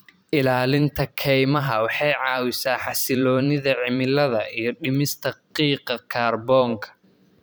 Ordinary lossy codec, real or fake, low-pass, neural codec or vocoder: none; fake; none; vocoder, 44.1 kHz, 128 mel bands every 512 samples, BigVGAN v2